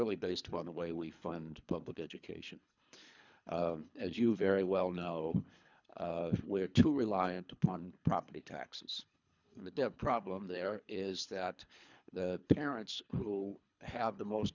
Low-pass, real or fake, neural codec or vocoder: 7.2 kHz; fake; codec, 24 kHz, 3 kbps, HILCodec